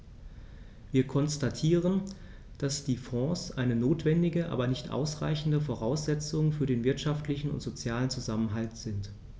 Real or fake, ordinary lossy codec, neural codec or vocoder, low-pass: real; none; none; none